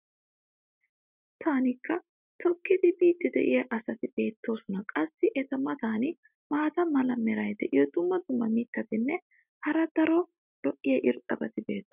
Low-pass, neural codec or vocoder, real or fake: 3.6 kHz; none; real